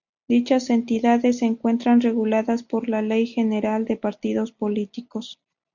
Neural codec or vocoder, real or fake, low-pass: none; real; 7.2 kHz